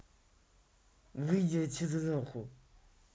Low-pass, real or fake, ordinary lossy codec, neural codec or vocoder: none; real; none; none